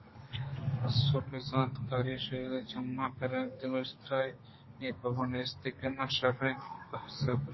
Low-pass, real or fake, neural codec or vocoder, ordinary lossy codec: 7.2 kHz; fake; codec, 32 kHz, 1.9 kbps, SNAC; MP3, 24 kbps